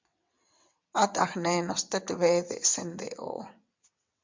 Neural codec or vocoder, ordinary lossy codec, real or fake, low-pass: vocoder, 22.05 kHz, 80 mel bands, WaveNeXt; MP3, 64 kbps; fake; 7.2 kHz